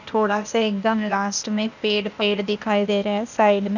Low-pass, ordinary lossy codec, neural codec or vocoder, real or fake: 7.2 kHz; none; codec, 16 kHz, 0.8 kbps, ZipCodec; fake